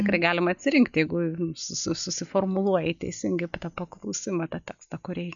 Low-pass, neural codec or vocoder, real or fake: 7.2 kHz; none; real